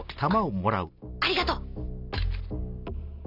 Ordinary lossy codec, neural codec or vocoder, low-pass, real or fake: none; none; 5.4 kHz; real